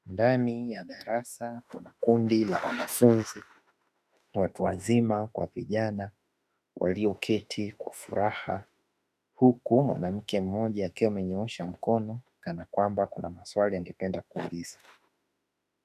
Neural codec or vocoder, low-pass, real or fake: autoencoder, 48 kHz, 32 numbers a frame, DAC-VAE, trained on Japanese speech; 14.4 kHz; fake